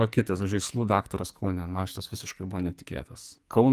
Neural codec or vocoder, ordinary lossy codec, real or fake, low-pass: codec, 44.1 kHz, 2.6 kbps, SNAC; Opus, 32 kbps; fake; 14.4 kHz